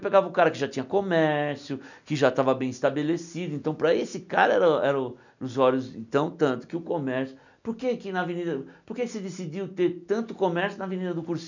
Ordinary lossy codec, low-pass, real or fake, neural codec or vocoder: none; 7.2 kHz; real; none